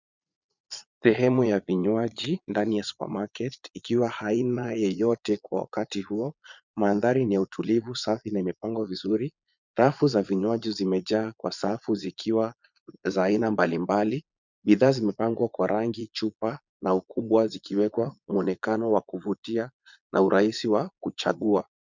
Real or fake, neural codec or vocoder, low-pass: fake; vocoder, 22.05 kHz, 80 mel bands, Vocos; 7.2 kHz